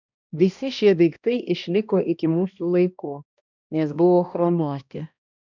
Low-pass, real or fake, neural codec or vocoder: 7.2 kHz; fake; codec, 16 kHz, 1 kbps, X-Codec, HuBERT features, trained on balanced general audio